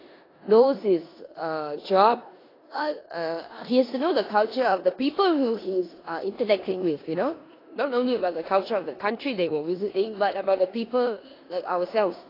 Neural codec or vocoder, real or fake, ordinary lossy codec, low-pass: codec, 16 kHz in and 24 kHz out, 0.9 kbps, LongCat-Audio-Codec, four codebook decoder; fake; AAC, 24 kbps; 5.4 kHz